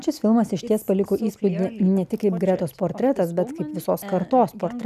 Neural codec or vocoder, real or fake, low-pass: none; real; 14.4 kHz